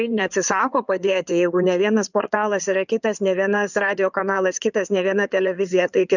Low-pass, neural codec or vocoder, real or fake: 7.2 kHz; codec, 16 kHz in and 24 kHz out, 2.2 kbps, FireRedTTS-2 codec; fake